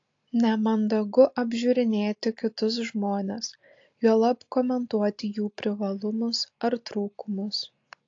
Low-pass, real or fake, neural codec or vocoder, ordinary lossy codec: 7.2 kHz; real; none; AAC, 48 kbps